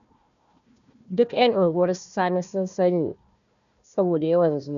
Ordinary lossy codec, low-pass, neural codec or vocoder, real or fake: none; 7.2 kHz; codec, 16 kHz, 1 kbps, FunCodec, trained on Chinese and English, 50 frames a second; fake